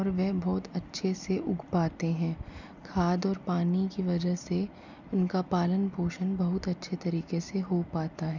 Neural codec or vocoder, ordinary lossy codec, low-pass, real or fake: none; none; 7.2 kHz; real